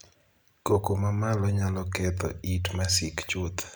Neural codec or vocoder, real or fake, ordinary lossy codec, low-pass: none; real; none; none